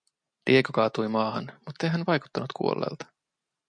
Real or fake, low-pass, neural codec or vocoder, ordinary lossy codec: real; 9.9 kHz; none; MP3, 64 kbps